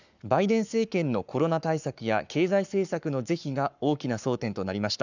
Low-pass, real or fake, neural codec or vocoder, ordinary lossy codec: 7.2 kHz; fake; codec, 44.1 kHz, 7.8 kbps, Pupu-Codec; none